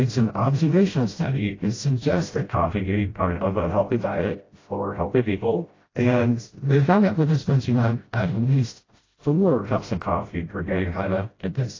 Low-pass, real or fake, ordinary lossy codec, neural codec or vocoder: 7.2 kHz; fake; AAC, 32 kbps; codec, 16 kHz, 0.5 kbps, FreqCodec, smaller model